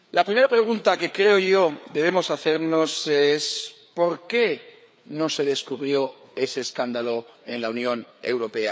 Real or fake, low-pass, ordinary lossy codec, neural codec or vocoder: fake; none; none; codec, 16 kHz, 4 kbps, FreqCodec, larger model